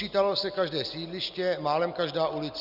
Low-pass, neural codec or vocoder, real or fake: 5.4 kHz; none; real